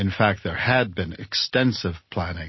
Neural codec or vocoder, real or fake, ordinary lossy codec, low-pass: none; real; MP3, 24 kbps; 7.2 kHz